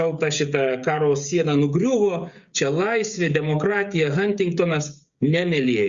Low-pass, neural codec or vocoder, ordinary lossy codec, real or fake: 7.2 kHz; codec, 16 kHz, 16 kbps, FreqCodec, smaller model; Opus, 64 kbps; fake